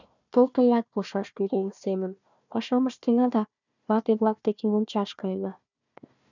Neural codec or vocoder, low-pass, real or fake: codec, 16 kHz, 1 kbps, FunCodec, trained on Chinese and English, 50 frames a second; 7.2 kHz; fake